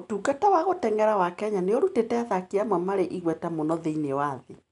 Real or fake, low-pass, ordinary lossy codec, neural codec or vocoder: real; 10.8 kHz; none; none